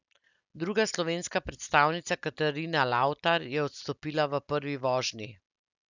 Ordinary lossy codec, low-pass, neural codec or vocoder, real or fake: none; 7.2 kHz; none; real